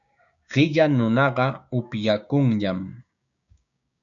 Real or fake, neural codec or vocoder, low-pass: fake; codec, 16 kHz, 6 kbps, DAC; 7.2 kHz